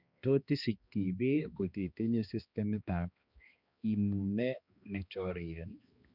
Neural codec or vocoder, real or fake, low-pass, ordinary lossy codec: codec, 16 kHz, 2 kbps, X-Codec, HuBERT features, trained on balanced general audio; fake; 5.4 kHz; Opus, 64 kbps